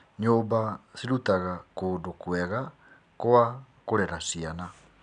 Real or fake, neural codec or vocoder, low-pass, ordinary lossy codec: real; none; 9.9 kHz; none